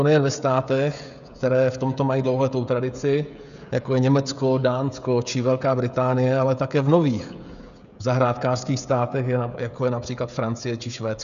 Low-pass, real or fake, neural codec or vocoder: 7.2 kHz; fake; codec, 16 kHz, 16 kbps, FreqCodec, smaller model